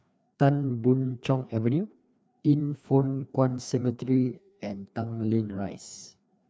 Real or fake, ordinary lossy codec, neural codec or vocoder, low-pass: fake; none; codec, 16 kHz, 2 kbps, FreqCodec, larger model; none